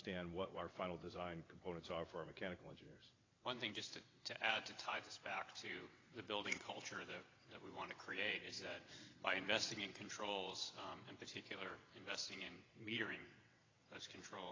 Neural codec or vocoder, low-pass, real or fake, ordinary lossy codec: vocoder, 22.05 kHz, 80 mel bands, WaveNeXt; 7.2 kHz; fake; AAC, 32 kbps